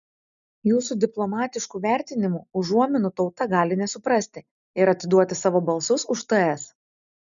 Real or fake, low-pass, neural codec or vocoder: real; 7.2 kHz; none